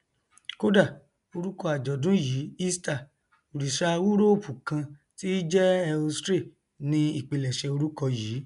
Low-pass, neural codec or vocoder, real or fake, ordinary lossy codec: 10.8 kHz; none; real; MP3, 96 kbps